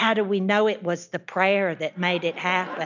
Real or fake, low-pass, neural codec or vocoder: real; 7.2 kHz; none